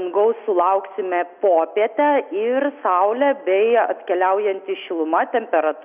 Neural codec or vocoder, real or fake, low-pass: none; real; 3.6 kHz